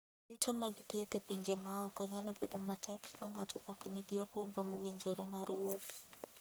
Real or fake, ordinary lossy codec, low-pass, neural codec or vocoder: fake; none; none; codec, 44.1 kHz, 1.7 kbps, Pupu-Codec